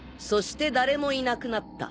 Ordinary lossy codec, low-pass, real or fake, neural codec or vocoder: none; none; real; none